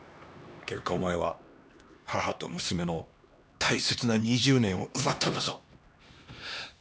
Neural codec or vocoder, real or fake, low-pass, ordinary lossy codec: codec, 16 kHz, 2 kbps, X-Codec, HuBERT features, trained on LibriSpeech; fake; none; none